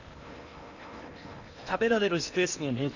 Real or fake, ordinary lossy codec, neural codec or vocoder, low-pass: fake; none; codec, 16 kHz in and 24 kHz out, 0.8 kbps, FocalCodec, streaming, 65536 codes; 7.2 kHz